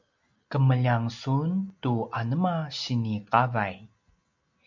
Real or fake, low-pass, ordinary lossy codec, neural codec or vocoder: real; 7.2 kHz; MP3, 64 kbps; none